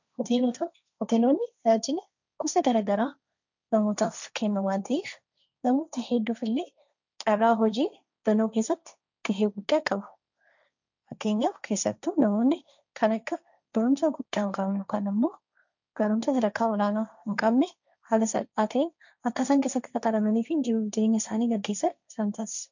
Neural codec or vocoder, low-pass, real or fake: codec, 16 kHz, 1.1 kbps, Voila-Tokenizer; 7.2 kHz; fake